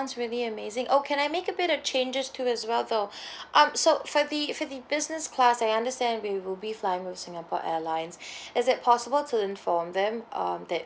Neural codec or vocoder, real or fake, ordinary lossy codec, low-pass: none; real; none; none